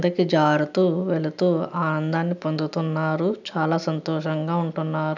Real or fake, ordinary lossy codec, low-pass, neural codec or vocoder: real; none; 7.2 kHz; none